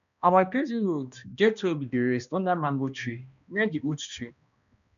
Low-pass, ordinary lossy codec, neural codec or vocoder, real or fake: 7.2 kHz; none; codec, 16 kHz, 1 kbps, X-Codec, HuBERT features, trained on balanced general audio; fake